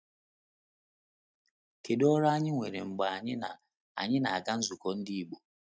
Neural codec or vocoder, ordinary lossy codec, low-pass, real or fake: none; none; none; real